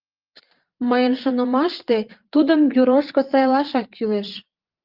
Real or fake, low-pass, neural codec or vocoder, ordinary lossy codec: fake; 5.4 kHz; vocoder, 22.05 kHz, 80 mel bands, Vocos; Opus, 32 kbps